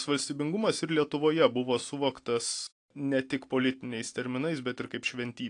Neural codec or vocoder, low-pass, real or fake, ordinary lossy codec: none; 9.9 kHz; real; AAC, 48 kbps